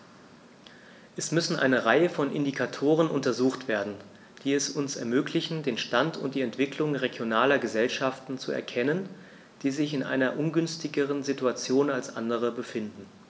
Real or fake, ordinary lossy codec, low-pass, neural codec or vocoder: real; none; none; none